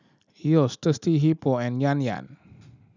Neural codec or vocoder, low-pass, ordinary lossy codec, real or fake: none; 7.2 kHz; none; real